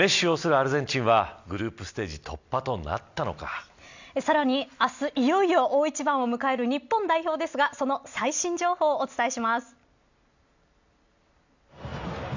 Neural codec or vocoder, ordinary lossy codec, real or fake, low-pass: none; none; real; 7.2 kHz